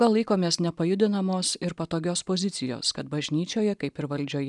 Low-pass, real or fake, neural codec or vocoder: 10.8 kHz; real; none